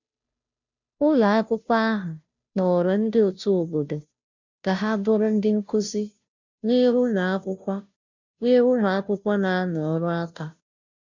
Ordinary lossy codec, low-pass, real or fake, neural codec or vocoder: none; 7.2 kHz; fake; codec, 16 kHz, 0.5 kbps, FunCodec, trained on Chinese and English, 25 frames a second